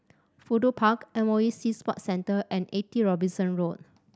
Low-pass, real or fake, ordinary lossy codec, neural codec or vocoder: none; real; none; none